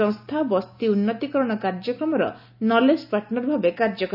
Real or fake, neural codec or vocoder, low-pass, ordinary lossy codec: real; none; 5.4 kHz; none